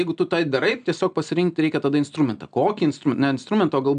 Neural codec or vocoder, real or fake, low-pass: none; real; 9.9 kHz